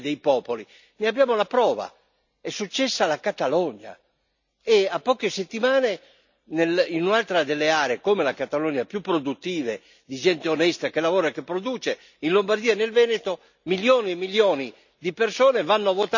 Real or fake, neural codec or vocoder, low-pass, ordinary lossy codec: real; none; 7.2 kHz; none